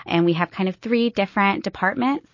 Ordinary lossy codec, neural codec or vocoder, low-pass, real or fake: MP3, 32 kbps; none; 7.2 kHz; real